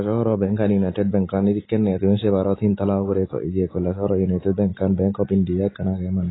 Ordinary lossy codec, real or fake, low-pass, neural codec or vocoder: AAC, 16 kbps; real; 7.2 kHz; none